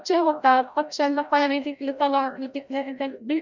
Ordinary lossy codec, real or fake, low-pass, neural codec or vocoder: none; fake; 7.2 kHz; codec, 16 kHz, 0.5 kbps, FreqCodec, larger model